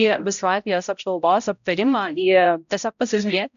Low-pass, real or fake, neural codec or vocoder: 7.2 kHz; fake; codec, 16 kHz, 0.5 kbps, X-Codec, HuBERT features, trained on balanced general audio